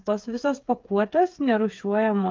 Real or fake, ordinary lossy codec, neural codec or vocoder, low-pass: fake; Opus, 32 kbps; codec, 16 kHz, 8 kbps, FreqCodec, smaller model; 7.2 kHz